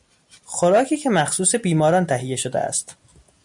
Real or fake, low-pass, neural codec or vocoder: real; 10.8 kHz; none